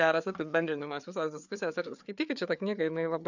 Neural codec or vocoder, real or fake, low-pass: codec, 16 kHz, 4 kbps, FreqCodec, larger model; fake; 7.2 kHz